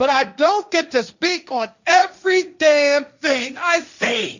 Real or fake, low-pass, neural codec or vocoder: fake; 7.2 kHz; codec, 16 kHz, 1.1 kbps, Voila-Tokenizer